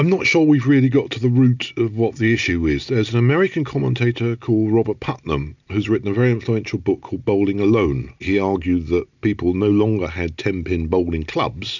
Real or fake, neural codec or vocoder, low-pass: real; none; 7.2 kHz